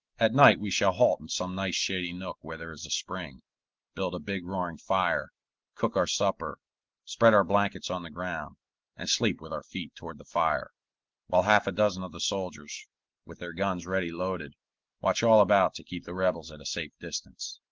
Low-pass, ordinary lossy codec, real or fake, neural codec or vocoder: 7.2 kHz; Opus, 16 kbps; real; none